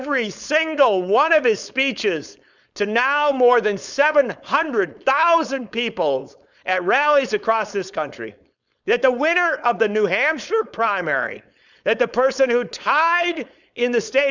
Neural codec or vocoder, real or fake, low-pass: codec, 16 kHz, 4.8 kbps, FACodec; fake; 7.2 kHz